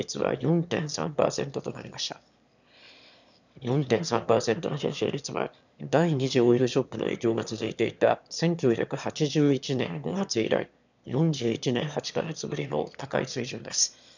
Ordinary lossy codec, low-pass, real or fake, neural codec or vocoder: none; 7.2 kHz; fake; autoencoder, 22.05 kHz, a latent of 192 numbers a frame, VITS, trained on one speaker